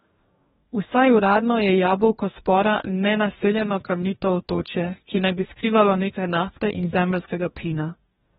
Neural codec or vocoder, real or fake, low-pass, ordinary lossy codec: codec, 44.1 kHz, 2.6 kbps, DAC; fake; 19.8 kHz; AAC, 16 kbps